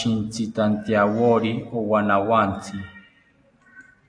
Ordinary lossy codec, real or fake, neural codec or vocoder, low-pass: AAC, 64 kbps; real; none; 9.9 kHz